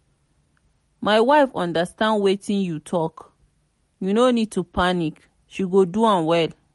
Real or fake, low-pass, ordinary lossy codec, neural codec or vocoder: real; 14.4 kHz; MP3, 48 kbps; none